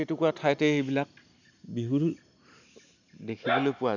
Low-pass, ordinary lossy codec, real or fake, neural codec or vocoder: 7.2 kHz; none; real; none